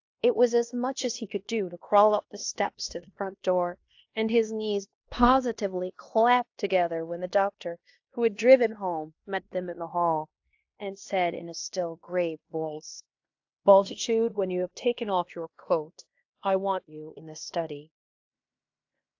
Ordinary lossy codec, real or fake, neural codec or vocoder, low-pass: AAC, 48 kbps; fake; codec, 16 kHz in and 24 kHz out, 0.9 kbps, LongCat-Audio-Codec, fine tuned four codebook decoder; 7.2 kHz